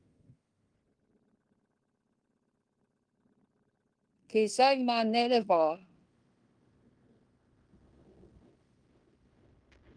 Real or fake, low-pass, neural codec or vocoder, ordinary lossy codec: fake; 9.9 kHz; codec, 16 kHz in and 24 kHz out, 0.9 kbps, LongCat-Audio-Codec, fine tuned four codebook decoder; Opus, 32 kbps